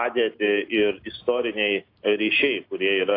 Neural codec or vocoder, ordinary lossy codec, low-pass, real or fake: none; AAC, 32 kbps; 5.4 kHz; real